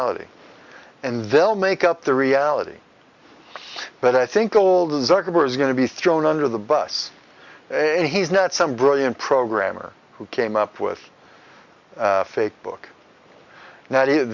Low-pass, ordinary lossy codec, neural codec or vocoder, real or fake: 7.2 kHz; Opus, 64 kbps; none; real